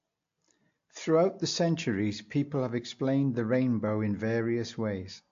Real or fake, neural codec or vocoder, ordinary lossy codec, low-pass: real; none; AAC, 48 kbps; 7.2 kHz